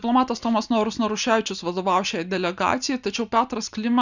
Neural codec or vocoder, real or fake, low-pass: none; real; 7.2 kHz